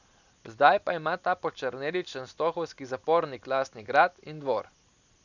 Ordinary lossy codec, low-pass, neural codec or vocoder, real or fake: none; 7.2 kHz; none; real